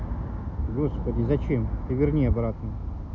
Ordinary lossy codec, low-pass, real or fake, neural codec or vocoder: none; 7.2 kHz; real; none